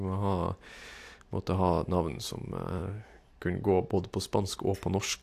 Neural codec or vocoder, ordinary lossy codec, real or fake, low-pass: none; AAC, 64 kbps; real; 14.4 kHz